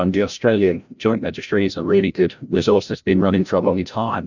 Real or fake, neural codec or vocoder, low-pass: fake; codec, 16 kHz, 0.5 kbps, FreqCodec, larger model; 7.2 kHz